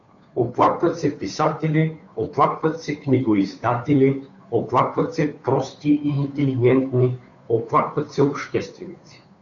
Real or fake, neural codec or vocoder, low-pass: fake; codec, 16 kHz, 2 kbps, FunCodec, trained on Chinese and English, 25 frames a second; 7.2 kHz